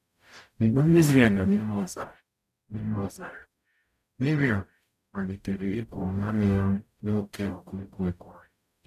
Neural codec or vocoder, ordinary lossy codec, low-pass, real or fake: codec, 44.1 kHz, 0.9 kbps, DAC; none; 14.4 kHz; fake